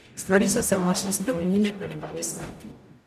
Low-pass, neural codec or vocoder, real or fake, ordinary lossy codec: 14.4 kHz; codec, 44.1 kHz, 0.9 kbps, DAC; fake; none